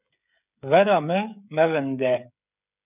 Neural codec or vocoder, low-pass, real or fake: codec, 16 kHz, 16 kbps, FreqCodec, smaller model; 3.6 kHz; fake